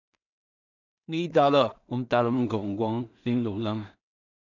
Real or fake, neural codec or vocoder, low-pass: fake; codec, 16 kHz in and 24 kHz out, 0.4 kbps, LongCat-Audio-Codec, two codebook decoder; 7.2 kHz